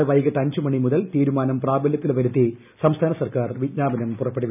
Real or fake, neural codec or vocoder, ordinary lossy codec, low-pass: real; none; none; 3.6 kHz